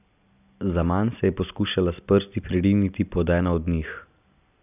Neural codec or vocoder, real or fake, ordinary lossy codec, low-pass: none; real; none; 3.6 kHz